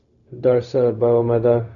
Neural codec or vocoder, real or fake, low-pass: codec, 16 kHz, 0.4 kbps, LongCat-Audio-Codec; fake; 7.2 kHz